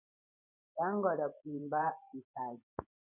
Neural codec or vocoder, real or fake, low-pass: vocoder, 24 kHz, 100 mel bands, Vocos; fake; 3.6 kHz